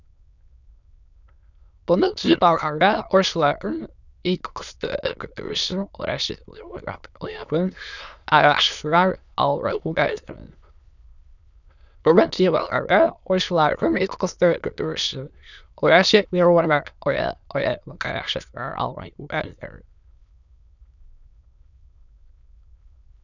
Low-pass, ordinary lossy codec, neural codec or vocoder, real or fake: 7.2 kHz; none; autoencoder, 22.05 kHz, a latent of 192 numbers a frame, VITS, trained on many speakers; fake